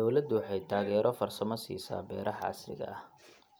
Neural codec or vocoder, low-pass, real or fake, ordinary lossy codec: none; none; real; none